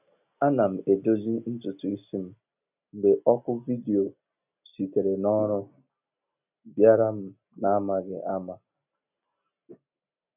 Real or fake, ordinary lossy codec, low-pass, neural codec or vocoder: real; none; 3.6 kHz; none